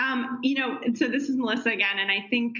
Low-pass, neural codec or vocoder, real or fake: 7.2 kHz; none; real